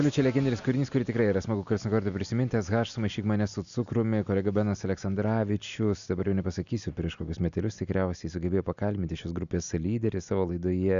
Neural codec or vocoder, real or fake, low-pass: none; real; 7.2 kHz